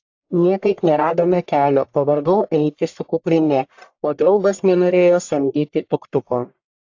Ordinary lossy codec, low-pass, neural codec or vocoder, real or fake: AAC, 48 kbps; 7.2 kHz; codec, 44.1 kHz, 1.7 kbps, Pupu-Codec; fake